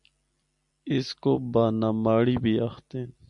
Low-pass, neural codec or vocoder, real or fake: 10.8 kHz; none; real